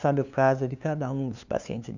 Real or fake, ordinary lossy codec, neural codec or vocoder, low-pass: fake; none; codec, 16 kHz, 2 kbps, FunCodec, trained on LibriTTS, 25 frames a second; 7.2 kHz